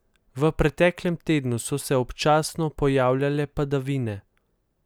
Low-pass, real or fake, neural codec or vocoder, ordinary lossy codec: none; real; none; none